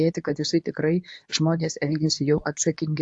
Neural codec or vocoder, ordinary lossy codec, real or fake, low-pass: codec, 24 kHz, 0.9 kbps, WavTokenizer, medium speech release version 2; AAC, 64 kbps; fake; 10.8 kHz